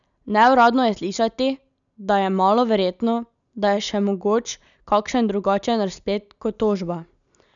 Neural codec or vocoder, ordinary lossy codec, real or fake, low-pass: none; none; real; 7.2 kHz